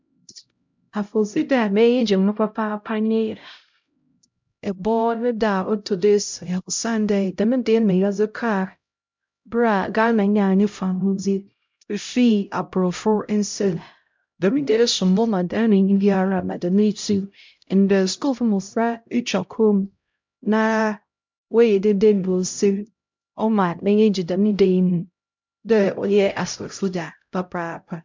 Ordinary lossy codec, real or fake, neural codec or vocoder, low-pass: MP3, 64 kbps; fake; codec, 16 kHz, 0.5 kbps, X-Codec, HuBERT features, trained on LibriSpeech; 7.2 kHz